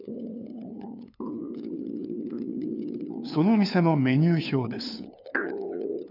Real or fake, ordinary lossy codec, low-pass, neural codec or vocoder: fake; none; 5.4 kHz; codec, 16 kHz, 2 kbps, FunCodec, trained on LibriTTS, 25 frames a second